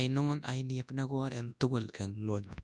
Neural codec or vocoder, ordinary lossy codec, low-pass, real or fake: codec, 24 kHz, 0.9 kbps, WavTokenizer, large speech release; none; 10.8 kHz; fake